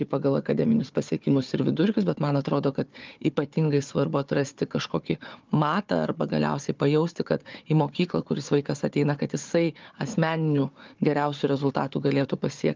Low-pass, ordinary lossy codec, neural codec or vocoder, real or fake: 7.2 kHz; Opus, 32 kbps; codec, 16 kHz, 4 kbps, FunCodec, trained on Chinese and English, 50 frames a second; fake